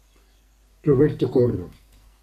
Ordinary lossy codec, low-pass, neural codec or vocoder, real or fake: AAC, 96 kbps; 14.4 kHz; codec, 44.1 kHz, 2.6 kbps, SNAC; fake